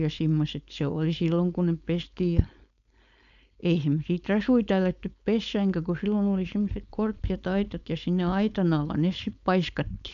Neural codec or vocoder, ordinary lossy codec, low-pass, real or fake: codec, 16 kHz, 4.8 kbps, FACodec; none; 7.2 kHz; fake